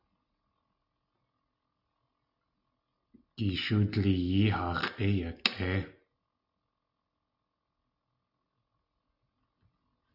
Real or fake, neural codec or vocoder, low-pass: real; none; 5.4 kHz